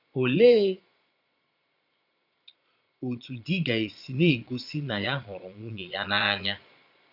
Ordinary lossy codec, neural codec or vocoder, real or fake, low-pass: none; vocoder, 22.05 kHz, 80 mel bands, Vocos; fake; 5.4 kHz